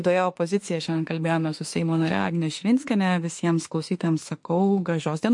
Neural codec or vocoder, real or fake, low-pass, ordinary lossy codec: autoencoder, 48 kHz, 32 numbers a frame, DAC-VAE, trained on Japanese speech; fake; 10.8 kHz; MP3, 48 kbps